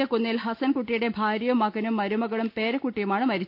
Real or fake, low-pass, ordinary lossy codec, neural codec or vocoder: real; 5.4 kHz; none; none